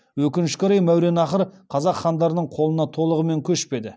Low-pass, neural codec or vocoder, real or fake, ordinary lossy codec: none; none; real; none